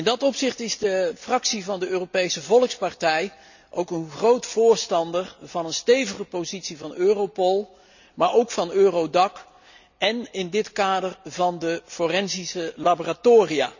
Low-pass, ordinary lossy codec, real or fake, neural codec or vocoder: 7.2 kHz; none; real; none